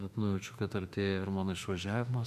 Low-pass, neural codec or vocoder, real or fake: 14.4 kHz; autoencoder, 48 kHz, 32 numbers a frame, DAC-VAE, trained on Japanese speech; fake